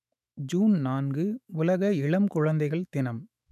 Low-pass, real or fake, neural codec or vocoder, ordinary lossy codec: 14.4 kHz; fake; autoencoder, 48 kHz, 128 numbers a frame, DAC-VAE, trained on Japanese speech; none